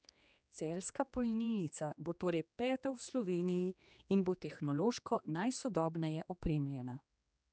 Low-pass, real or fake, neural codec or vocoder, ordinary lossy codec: none; fake; codec, 16 kHz, 2 kbps, X-Codec, HuBERT features, trained on general audio; none